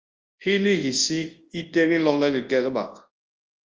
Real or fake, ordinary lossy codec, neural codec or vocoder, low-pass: fake; Opus, 24 kbps; codec, 24 kHz, 0.9 kbps, WavTokenizer, large speech release; 7.2 kHz